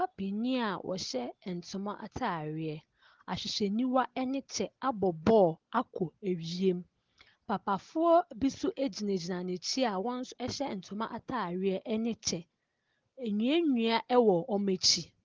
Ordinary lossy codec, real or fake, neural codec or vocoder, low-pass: Opus, 16 kbps; real; none; 7.2 kHz